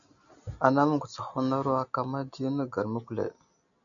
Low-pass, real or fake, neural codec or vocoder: 7.2 kHz; real; none